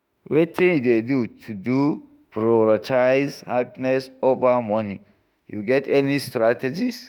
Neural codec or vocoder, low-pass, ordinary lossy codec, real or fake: autoencoder, 48 kHz, 32 numbers a frame, DAC-VAE, trained on Japanese speech; none; none; fake